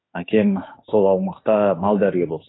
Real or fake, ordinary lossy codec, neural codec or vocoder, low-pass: fake; AAC, 16 kbps; autoencoder, 48 kHz, 32 numbers a frame, DAC-VAE, trained on Japanese speech; 7.2 kHz